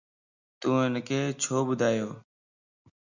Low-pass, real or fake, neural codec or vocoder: 7.2 kHz; real; none